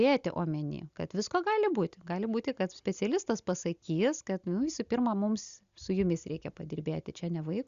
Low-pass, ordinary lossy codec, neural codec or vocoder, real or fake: 7.2 kHz; Opus, 64 kbps; none; real